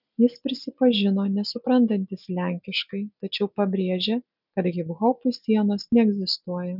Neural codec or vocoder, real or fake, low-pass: none; real; 5.4 kHz